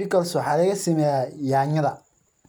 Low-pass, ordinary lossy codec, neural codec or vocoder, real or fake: none; none; none; real